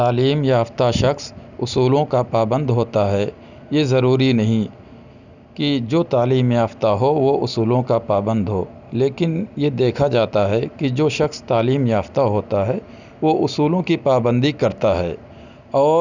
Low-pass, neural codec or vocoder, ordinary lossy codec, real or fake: 7.2 kHz; none; none; real